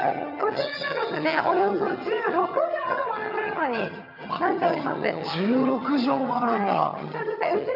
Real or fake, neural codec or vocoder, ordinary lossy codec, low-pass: fake; vocoder, 22.05 kHz, 80 mel bands, HiFi-GAN; none; 5.4 kHz